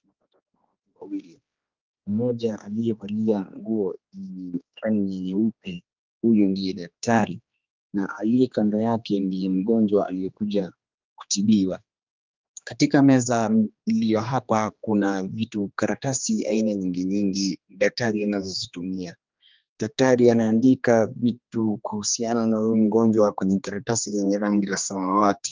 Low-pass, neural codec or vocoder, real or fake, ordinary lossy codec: 7.2 kHz; codec, 16 kHz, 2 kbps, X-Codec, HuBERT features, trained on general audio; fake; Opus, 32 kbps